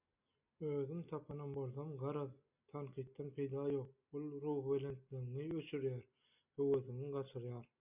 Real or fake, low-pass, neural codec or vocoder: real; 3.6 kHz; none